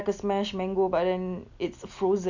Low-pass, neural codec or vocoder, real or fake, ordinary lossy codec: 7.2 kHz; none; real; none